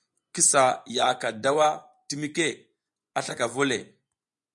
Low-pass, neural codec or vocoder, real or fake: 10.8 kHz; vocoder, 24 kHz, 100 mel bands, Vocos; fake